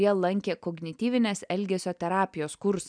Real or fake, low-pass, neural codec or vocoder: real; 9.9 kHz; none